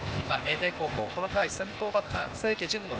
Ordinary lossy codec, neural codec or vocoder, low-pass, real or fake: none; codec, 16 kHz, 0.8 kbps, ZipCodec; none; fake